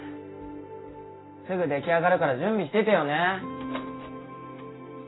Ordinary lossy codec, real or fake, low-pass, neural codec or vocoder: AAC, 16 kbps; real; 7.2 kHz; none